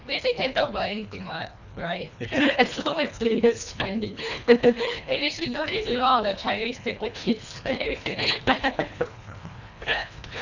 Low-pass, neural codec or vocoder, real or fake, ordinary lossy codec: 7.2 kHz; codec, 24 kHz, 1.5 kbps, HILCodec; fake; none